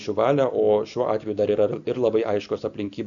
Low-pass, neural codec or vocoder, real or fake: 7.2 kHz; none; real